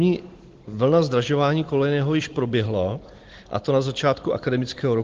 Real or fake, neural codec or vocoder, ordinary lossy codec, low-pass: real; none; Opus, 16 kbps; 7.2 kHz